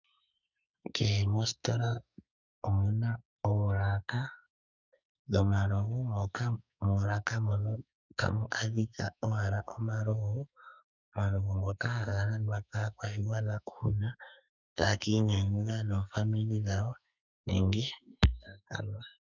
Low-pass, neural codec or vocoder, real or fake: 7.2 kHz; codec, 44.1 kHz, 2.6 kbps, SNAC; fake